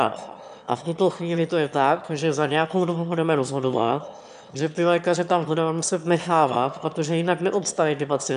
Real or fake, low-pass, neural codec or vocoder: fake; 9.9 kHz; autoencoder, 22.05 kHz, a latent of 192 numbers a frame, VITS, trained on one speaker